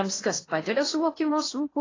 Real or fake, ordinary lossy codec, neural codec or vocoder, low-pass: fake; AAC, 32 kbps; codec, 16 kHz in and 24 kHz out, 0.8 kbps, FocalCodec, streaming, 65536 codes; 7.2 kHz